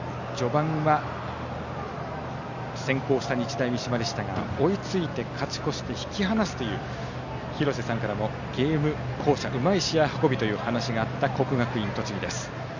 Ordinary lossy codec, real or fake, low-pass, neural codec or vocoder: none; real; 7.2 kHz; none